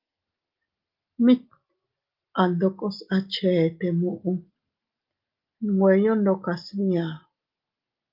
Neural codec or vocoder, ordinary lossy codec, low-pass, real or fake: none; Opus, 32 kbps; 5.4 kHz; real